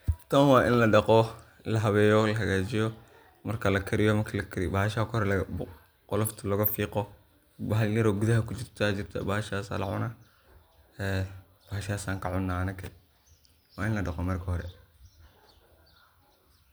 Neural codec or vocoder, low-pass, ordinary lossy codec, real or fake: none; none; none; real